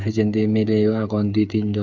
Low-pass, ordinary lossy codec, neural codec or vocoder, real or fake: 7.2 kHz; MP3, 64 kbps; codec, 16 kHz, 8 kbps, FreqCodec, smaller model; fake